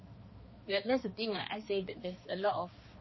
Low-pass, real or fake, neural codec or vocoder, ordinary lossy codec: 7.2 kHz; fake; codec, 16 kHz, 2 kbps, X-Codec, HuBERT features, trained on balanced general audio; MP3, 24 kbps